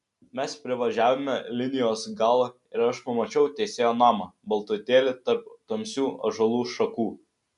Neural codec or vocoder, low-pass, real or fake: none; 10.8 kHz; real